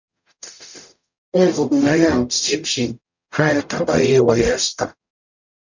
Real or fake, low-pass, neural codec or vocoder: fake; 7.2 kHz; codec, 44.1 kHz, 0.9 kbps, DAC